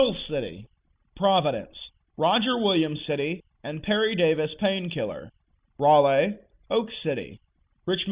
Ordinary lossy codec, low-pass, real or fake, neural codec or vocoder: Opus, 64 kbps; 3.6 kHz; real; none